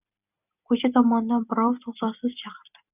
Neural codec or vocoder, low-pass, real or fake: none; 3.6 kHz; real